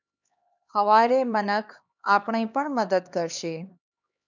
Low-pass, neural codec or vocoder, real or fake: 7.2 kHz; codec, 16 kHz, 2 kbps, X-Codec, HuBERT features, trained on LibriSpeech; fake